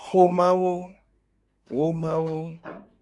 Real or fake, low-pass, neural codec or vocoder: fake; 10.8 kHz; codec, 24 kHz, 1 kbps, SNAC